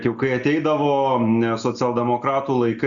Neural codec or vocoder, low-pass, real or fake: none; 7.2 kHz; real